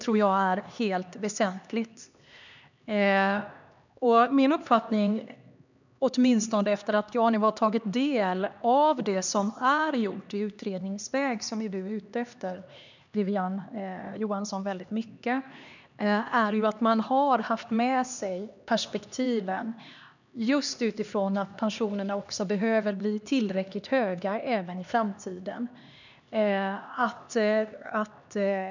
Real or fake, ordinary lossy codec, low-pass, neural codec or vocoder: fake; none; 7.2 kHz; codec, 16 kHz, 2 kbps, X-Codec, HuBERT features, trained on LibriSpeech